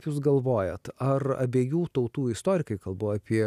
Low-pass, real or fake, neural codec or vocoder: 14.4 kHz; real; none